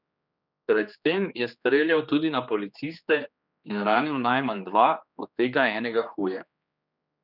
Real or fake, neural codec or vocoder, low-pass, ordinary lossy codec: fake; codec, 16 kHz, 2 kbps, X-Codec, HuBERT features, trained on general audio; 5.4 kHz; none